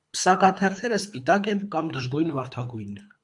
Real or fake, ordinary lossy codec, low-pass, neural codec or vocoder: fake; AAC, 64 kbps; 10.8 kHz; codec, 24 kHz, 3 kbps, HILCodec